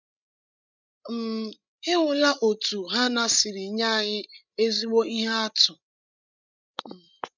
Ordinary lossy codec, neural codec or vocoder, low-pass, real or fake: none; codec, 16 kHz, 16 kbps, FreqCodec, larger model; 7.2 kHz; fake